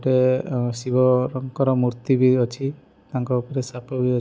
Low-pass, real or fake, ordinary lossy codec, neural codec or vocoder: none; real; none; none